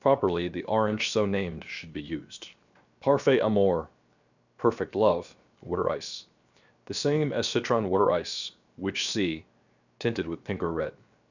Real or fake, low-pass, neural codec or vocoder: fake; 7.2 kHz; codec, 16 kHz, 0.7 kbps, FocalCodec